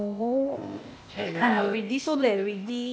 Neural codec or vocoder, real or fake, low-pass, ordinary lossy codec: codec, 16 kHz, 0.8 kbps, ZipCodec; fake; none; none